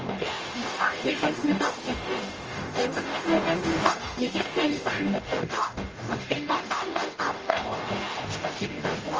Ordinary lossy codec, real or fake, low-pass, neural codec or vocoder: Opus, 24 kbps; fake; 7.2 kHz; codec, 44.1 kHz, 0.9 kbps, DAC